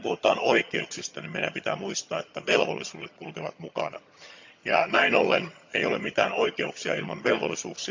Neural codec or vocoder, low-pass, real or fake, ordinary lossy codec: vocoder, 22.05 kHz, 80 mel bands, HiFi-GAN; 7.2 kHz; fake; MP3, 64 kbps